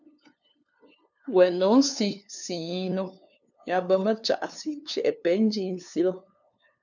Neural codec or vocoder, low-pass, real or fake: codec, 16 kHz, 2 kbps, FunCodec, trained on LibriTTS, 25 frames a second; 7.2 kHz; fake